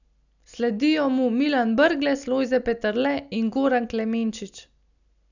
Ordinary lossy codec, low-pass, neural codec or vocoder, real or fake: none; 7.2 kHz; none; real